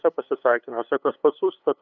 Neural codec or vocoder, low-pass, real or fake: codec, 16 kHz, 8 kbps, FunCodec, trained on LibriTTS, 25 frames a second; 7.2 kHz; fake